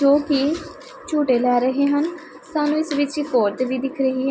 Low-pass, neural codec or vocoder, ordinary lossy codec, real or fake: none; none; none; real